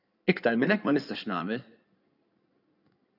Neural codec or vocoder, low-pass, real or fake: codec, 16 kHz in and 24 kHz out, 2.2 kbps, FireRedTTS-2 codec; 5.4 kHz; fake